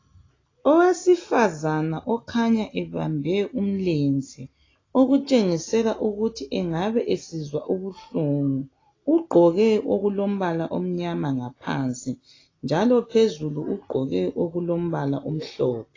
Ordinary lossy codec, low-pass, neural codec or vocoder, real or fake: AAC, 32 kbps; 7.2 kHz; none; real